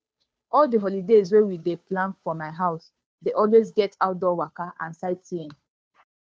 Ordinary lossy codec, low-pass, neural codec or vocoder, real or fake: none; none; codec, 16 kHz, 2 kbps, FunCodec, trained on Chinese and English, 25 frames a second; fake